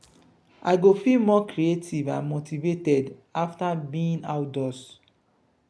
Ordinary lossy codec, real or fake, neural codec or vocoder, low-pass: none; real; none; none